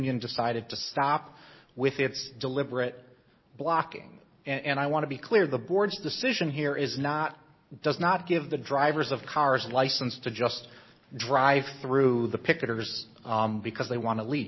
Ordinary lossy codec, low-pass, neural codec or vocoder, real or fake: MP3, 24 kbps; 7.2 kHz; none; real